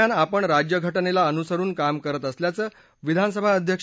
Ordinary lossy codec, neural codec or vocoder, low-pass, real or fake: none; none; none; real